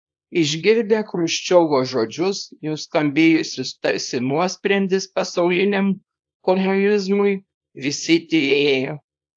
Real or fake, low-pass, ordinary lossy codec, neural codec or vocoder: fake; 9.9 kHz; AAC, 64 kbps; codec, 24 kHz, 0.9 kbps, WavTokenizer, small release